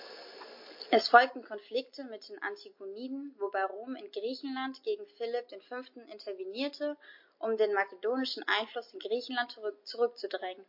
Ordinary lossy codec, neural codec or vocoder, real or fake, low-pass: MP3, 32 kbps; none; real; 5.4 kHz